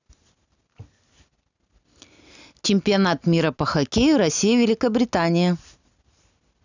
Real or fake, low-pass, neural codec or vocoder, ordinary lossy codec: real; 7.2 kHz; none; none